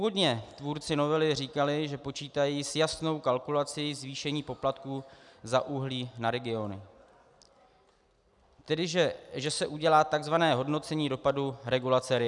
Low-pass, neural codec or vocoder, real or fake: 10.8 kHz; none; real